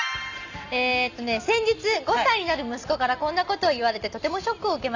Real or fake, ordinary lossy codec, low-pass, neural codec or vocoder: real; none; 7.2 kHz; none